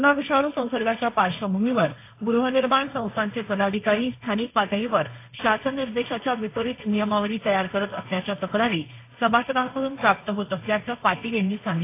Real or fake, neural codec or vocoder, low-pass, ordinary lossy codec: fake; codec, 16 kHz, 1.1 kbps, Voila-Tokenizer; 3.6 kHz; AAC, 24 kbps